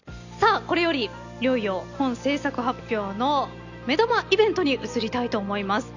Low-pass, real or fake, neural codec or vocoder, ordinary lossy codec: 7.2 kHz; real; none; none